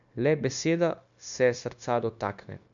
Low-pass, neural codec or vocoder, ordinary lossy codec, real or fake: 7.2 kHz; codec, 16 kHz, 0.9 kbps, LongCat-Audio-Codec; MP3, 64 kbps; fake